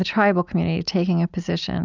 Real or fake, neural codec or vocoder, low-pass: real; none; 7.2 kHz